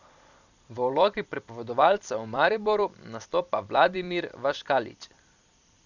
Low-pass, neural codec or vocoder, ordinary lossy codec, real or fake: 7.2 kHz; vocoder, 44.1 kHz, 128 mel bands every 256 samples, BigVGAN v2; none; fake